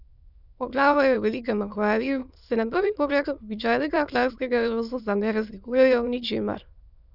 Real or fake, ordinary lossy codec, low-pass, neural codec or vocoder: fake; none; 5.4 kHz; autoencoder, 22.05 kHz, a latent of 192 numbers a frame, VITS, trained on many speakers